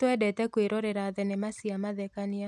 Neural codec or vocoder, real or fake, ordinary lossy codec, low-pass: none; real; none; none